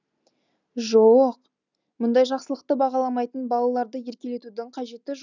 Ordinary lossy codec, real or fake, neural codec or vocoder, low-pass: none; real; none; 7.2 kHz